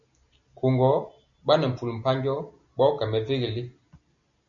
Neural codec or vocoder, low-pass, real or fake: none; 7.2 kHz; real